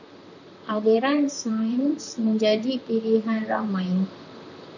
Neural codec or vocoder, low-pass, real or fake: vocoder, 44.1 kHz, 128 mel bands, Pupu-Vocoder; 7.2 kHz; fake